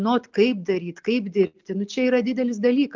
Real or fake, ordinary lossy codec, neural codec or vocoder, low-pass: real; MP3, 64 kbps; none; 7.2 kHz